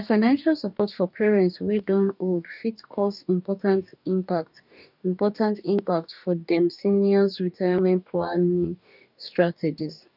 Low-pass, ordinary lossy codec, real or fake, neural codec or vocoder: 5.4 kHz; none; fake; codec, 44.1 kHz, 2.6 kbps, DAC